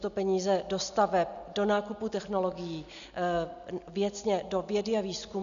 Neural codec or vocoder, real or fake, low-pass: none; real; 7.2 kHz